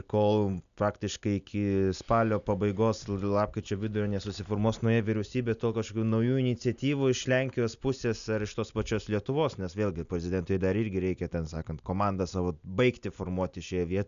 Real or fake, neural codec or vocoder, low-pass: real; none; 7.2 kHz